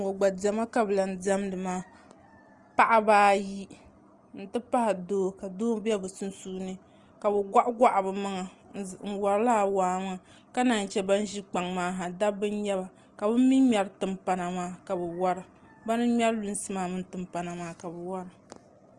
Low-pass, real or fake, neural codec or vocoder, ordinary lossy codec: 10.8 kHz; real; none; Opus, 24 kbps